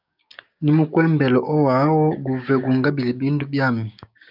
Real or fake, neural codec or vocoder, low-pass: fake; codec, 16 kHz, 6 kbps, DAC; 5.4 kHz